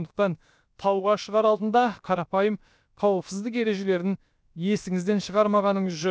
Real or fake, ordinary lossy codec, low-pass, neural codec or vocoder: fake; none; none; codec, 16 kHz, about 1 kbps, DyCAST, with the encoder's durations